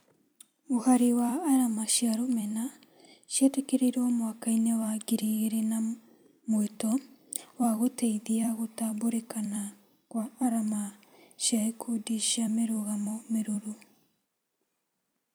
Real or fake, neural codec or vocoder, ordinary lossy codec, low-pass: fake; vocoder, 44.1 kHz, 128 mel bands every 256 samples, BigVGAN v2; none; none